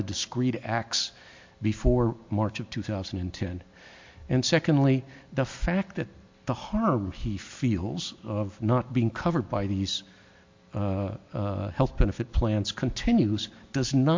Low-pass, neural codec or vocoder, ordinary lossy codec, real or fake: 7.2 kHz; none; MP3, 48 kbps; real